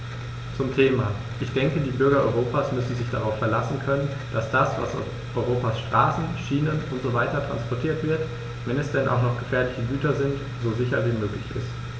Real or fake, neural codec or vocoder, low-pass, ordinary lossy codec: real; none; none; none